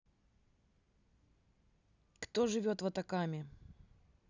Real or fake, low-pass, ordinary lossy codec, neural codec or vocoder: real; 7.2 kHz; none; none